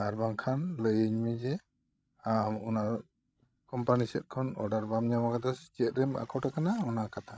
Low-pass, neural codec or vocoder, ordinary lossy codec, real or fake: none; codec, 16 kHz, 16 kbps, FreqCodec, larger model; none; fake